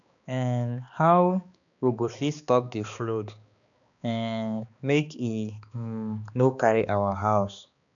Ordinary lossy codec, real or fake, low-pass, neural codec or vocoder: none; fake; 7.2 kHz; codec, 16 kHz, 2 kbps, X-Codec, HuBERT features, trained on balanced general audio